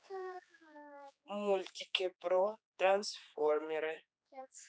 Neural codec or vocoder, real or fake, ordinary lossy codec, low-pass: codec, 16 kHz, 4 kbps, X-Codec, HuBERT features, trained on general audio; fake; none; none